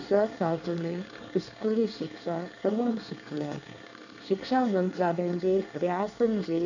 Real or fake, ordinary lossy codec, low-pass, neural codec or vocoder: fake; none; 7.2 kHz; codec, 24 kHz, 1 kbps, SNAC